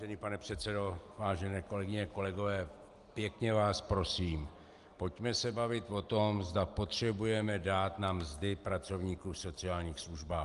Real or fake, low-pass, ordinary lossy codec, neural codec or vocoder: real; 10.8 kHz; Opus, 32 kbps; none